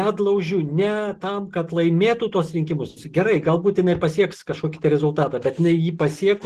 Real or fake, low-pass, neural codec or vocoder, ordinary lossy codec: real; 14.4 kHz; none; Opus, 16 kbps